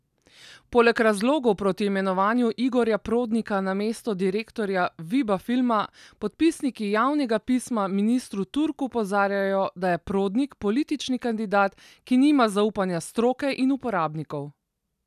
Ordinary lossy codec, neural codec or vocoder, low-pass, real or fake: none; none; 14.4 kHz; real